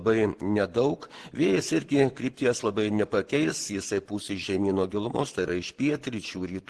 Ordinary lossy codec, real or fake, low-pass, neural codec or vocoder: Opus, 16 kbps; fake; 10.8 kHz; vocoder, 24 kHz, 100 mel bands, Vocos